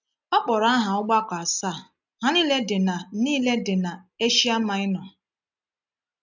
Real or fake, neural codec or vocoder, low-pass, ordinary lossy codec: real; none; 7.2 kHz; none